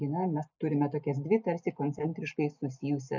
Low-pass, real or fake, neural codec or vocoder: 7.2 kHz; real; none